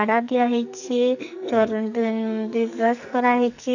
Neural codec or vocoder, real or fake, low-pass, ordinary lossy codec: codec, 44.1 kHz, 2.6 kbps, SNAC; fake; 7.2 kHz; none